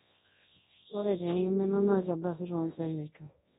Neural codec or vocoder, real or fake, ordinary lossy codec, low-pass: codec, 24 kHz, 0.9 kbps, WavTokenizer, large speech release; fake; AAC, 16 kbps; 10.8 kHz